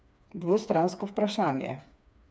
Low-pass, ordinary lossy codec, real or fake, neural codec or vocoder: none; none; fake; codec, 16 kHz, 4 kbps, FreqCodec, smaller model